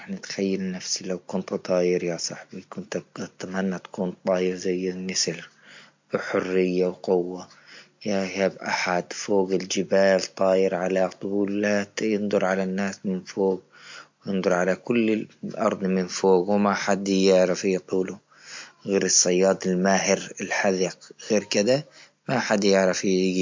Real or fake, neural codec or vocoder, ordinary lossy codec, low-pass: real; none; MP3, 48 kbps; 7.2 kHz